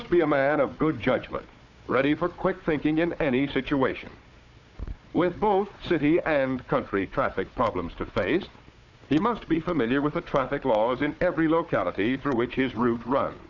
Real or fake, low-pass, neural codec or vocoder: fake; 7.2 kHz; codec, 16 kHz, 4 kbps, FunCodec, trained on Chinese and English, 50 frames a second